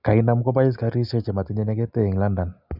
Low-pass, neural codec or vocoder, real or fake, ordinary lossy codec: 5.4 kHz; none; real; none